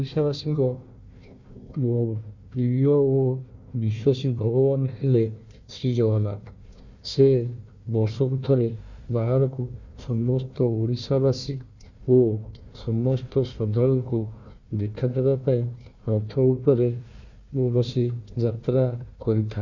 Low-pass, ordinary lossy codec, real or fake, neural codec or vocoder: 7.2 kHz; Opus, 64 kbps; fake; codec, 16 kHz, 1 kbps, FunCodec, trained on Chinese and English, 50 frames a second